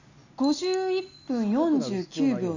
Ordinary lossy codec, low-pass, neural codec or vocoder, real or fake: none; 7.2 kHz; none; real